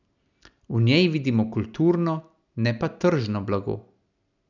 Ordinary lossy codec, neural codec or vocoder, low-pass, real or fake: none; none; 7.2 kHz; real